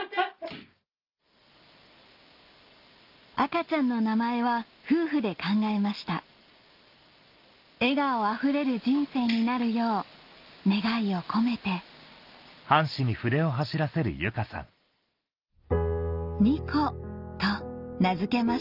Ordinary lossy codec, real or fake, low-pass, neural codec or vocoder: Opus, 24 kbps; real; 5.4 kHz; none